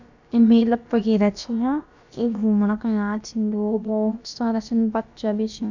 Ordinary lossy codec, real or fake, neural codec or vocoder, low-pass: none; fake; codec, 16 kHz, about 1 kbps, DyCAST, with the encoder's durations; 7.2 kHz